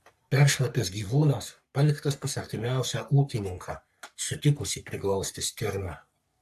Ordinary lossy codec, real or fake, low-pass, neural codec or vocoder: AAC, 96 kbps; fake; 14.4 kHz; codec, 44.1 kHz, 3.4 kbps, Pupu-Codec